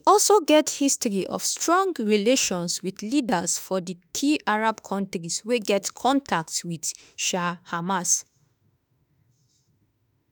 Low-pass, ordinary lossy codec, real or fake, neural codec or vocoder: none; none; fake; autoencoder, 48 kHz, 32 numbers a frame, DAC-VAE, trained on Japanese speech